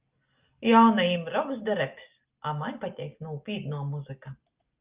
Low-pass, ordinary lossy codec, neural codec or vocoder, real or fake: 3.6 kHz; Opus, 24 kbps; none; real